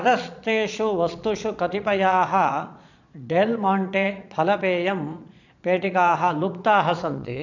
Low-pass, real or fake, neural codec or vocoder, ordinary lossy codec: 7.2 kHz; fake; vocoder, 22.05 kHz, 80 mel bands, Vocos; none